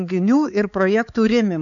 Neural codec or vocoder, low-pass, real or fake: codec, 16 kHz, 4 kbps, X-Codec, HuBERT features, trained on balanced general audio; 7.2 kHz; fake